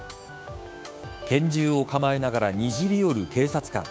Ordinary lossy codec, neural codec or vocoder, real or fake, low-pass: none; codec, 16 kHz, 6 kbps, DAC; fake; none